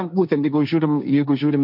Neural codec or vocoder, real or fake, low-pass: codec, 16 kHz, 1.1 kbps, Voila-Tokenizer; fake; 5.4 kHz